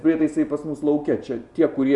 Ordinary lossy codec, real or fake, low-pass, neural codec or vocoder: Opus, 64 kbps; real; 10.8 kHz; none